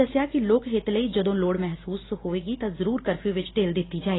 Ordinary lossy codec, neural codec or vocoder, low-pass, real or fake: AAC, 16 kbps; none; 7.2 kHz; real